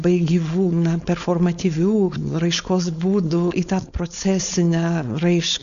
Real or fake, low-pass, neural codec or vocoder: fake; 7.2 kHz; codec, 16 kHz, 4.8 kbps, FACodec